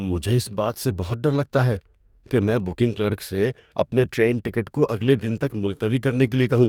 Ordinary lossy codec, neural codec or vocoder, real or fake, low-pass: none; codec, 44.1 kHz, 2.6 kbps, DAC; fake; 19.8 kHz